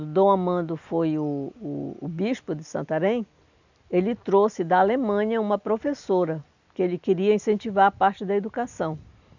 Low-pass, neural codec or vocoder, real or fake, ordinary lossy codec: 7.2 kHz; none; real; none